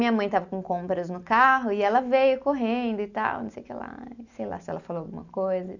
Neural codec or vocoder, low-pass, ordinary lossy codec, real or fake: none; 7.2 kHz; none; real